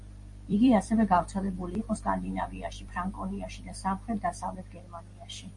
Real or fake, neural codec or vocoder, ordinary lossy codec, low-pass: fake; vocoder, 44.1 kHz, 128 mel bands every 256 samples, BigVGAN v2; MP3, 48 kbps; 10.8 kHz